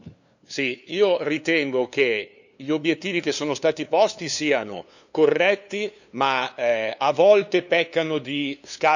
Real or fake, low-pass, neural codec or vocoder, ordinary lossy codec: fake; 7.2 kHz; codec, 16 kHz, 2 kbps, FunCodec, trained on LibriTTS, 25 frames a second; none